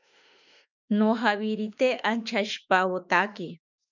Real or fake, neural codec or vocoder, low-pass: fake; autoencoder, 48 kHz, 32 numbers a frame, DAC-VAE, trained on Japanese speech; 7.2 kHz